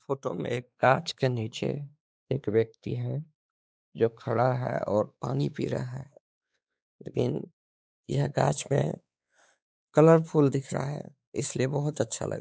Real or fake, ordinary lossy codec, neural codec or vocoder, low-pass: fake; none; codec, 16 kHz, 4 kbps, X-Codec, WavLM features, trained on Multilingual LibriSpeech; none